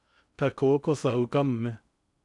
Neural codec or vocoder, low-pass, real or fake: codec, 16 kHz in and 24 kHz out, 0.6 kbps, FocalCodec, streaming, 2048 codes; 10.8 kHz; fake